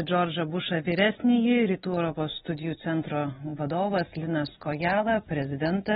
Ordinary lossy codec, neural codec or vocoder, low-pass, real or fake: AAC, 16 kbps; none; 19.8 kHz; real